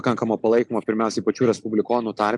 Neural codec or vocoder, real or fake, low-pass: none; real; 10.8 kHz